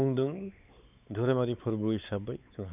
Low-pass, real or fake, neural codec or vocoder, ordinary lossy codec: 3.6 kHz; fake; codec, 16 kHz, 4 kbps, X-Codec, WavLM features, trained on Multilingual LibriSpeech; none